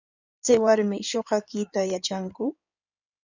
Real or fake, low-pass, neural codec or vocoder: fake; 7.2 kHz; codec, 16 kHz in and 24 kHz out, 2.2 kbps, FireRedTTS-2 codec